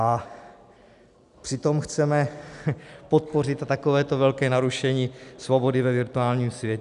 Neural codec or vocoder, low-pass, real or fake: none; 10.8 kHz; real